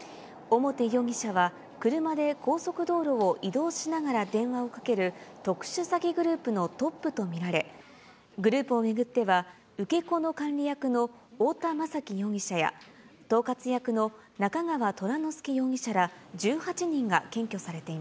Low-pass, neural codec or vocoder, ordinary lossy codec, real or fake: none; none; none; real